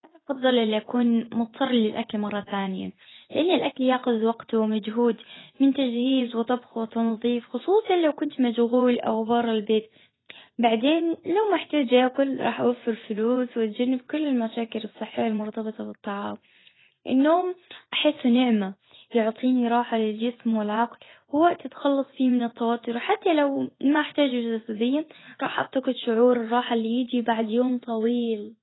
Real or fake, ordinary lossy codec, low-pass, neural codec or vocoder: fake; AAC, 16 kbps; 7.2 kHz; vocoder, 24 kHz, 100 mel bands, Vocos